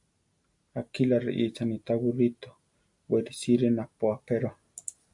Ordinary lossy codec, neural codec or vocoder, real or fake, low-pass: AAC, 64 kbps; none; real; 10.8 kHz